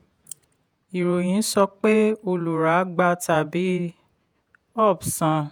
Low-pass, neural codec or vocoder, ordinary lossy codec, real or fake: none; vocoder, 48 kHz, 128 mel bands, Vocos; none; fake